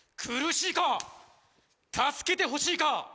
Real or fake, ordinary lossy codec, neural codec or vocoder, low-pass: real; none; none; none